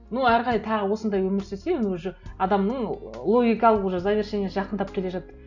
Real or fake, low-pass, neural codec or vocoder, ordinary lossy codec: real; 7.2 kHz; none; none